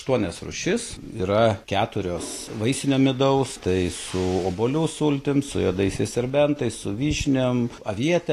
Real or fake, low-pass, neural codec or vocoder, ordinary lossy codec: real; 14.4 kHz; none; AAC, 48 kbps